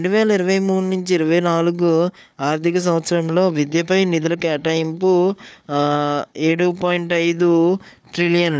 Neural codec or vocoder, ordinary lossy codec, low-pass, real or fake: codec, 16 kHz, 4 kbps, FunCodec, trained on Chinese and English, 50 frames a second; none; none; fake